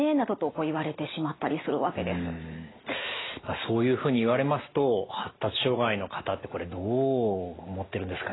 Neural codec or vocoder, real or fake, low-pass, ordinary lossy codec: none; real; 7.2 kHz; AAC, 16 kbps